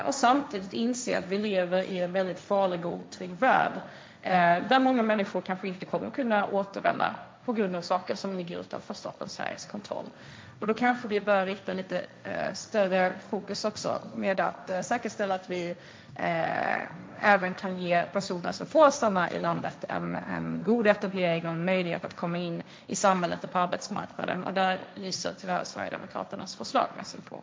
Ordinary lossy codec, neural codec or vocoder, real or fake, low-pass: none; codec, 16 kHz, 1.1 kbps, Voila-Tokenizer; fake; none